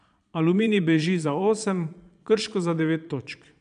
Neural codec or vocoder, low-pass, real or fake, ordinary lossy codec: vocoder, 22.05 kHz, 80 mel bands, Vocos; 9.9 kHz; fake; none